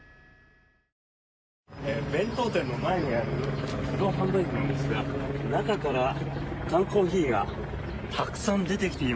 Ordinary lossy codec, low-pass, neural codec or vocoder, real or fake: none; none; none; real